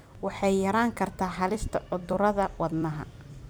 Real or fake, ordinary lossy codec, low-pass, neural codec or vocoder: fake; none; none; vocoder, 44.1 kHz, 128 mel bands every 256 samples, BigVGAN v2